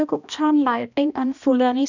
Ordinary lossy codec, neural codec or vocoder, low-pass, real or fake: none; codec, 16 kHz, 1 kbps, X-Codec, HuBERT features, trained on balanced general audio; 7.2 kHz; fake